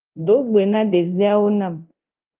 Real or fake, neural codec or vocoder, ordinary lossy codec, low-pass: fake; codec, 24 kHz, 0.9 kbps, DualCodec; Opus, 24 kbps; 3.6 kHz